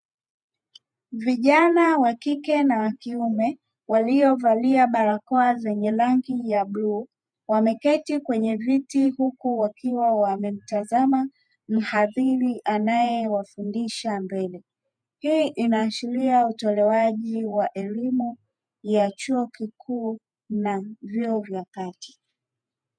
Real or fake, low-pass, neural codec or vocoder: fake; 9.9 kHz; vocoder, 48 kHz, 128 mel bands, Vocos